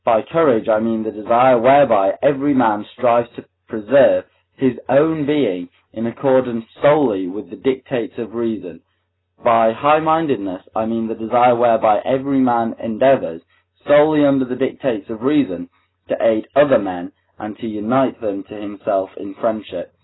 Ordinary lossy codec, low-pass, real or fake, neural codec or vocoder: AAC, 16 kbps; 7.2 kHz; real; none